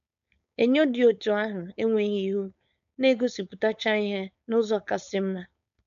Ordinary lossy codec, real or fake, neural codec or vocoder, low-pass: none; fake; codec, 16 kHz, 4.8 kbps, FACodec; 7.2 kHz